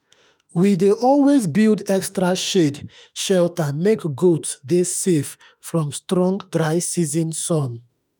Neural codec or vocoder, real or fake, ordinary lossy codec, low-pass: autoencoder, 48 kHz, 32 numbers a frame, DAC-VAE, trained on Japanese speech; fake; none; none